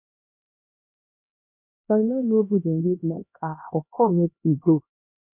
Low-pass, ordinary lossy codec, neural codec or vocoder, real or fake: 3.6 kHz; none; codec, 16 kHz, 1 kbps, X-Codec, HuBERT features, trained on LibriSpeech; fake